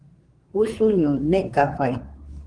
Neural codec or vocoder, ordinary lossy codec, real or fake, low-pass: codec, 24 kHz, 1 kbps, SNAC; Opus, 24 kbps; fake; 9.9 kHz